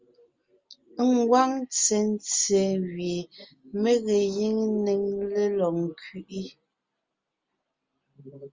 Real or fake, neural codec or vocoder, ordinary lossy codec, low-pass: real; none; Opus, 32 kbps; 7.2 kHz